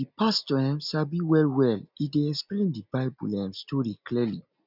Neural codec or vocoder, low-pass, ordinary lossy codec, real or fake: none; 5.4 kHz; none; real